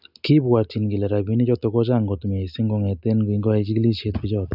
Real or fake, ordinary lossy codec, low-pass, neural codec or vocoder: real; none; 5.4 kHz; none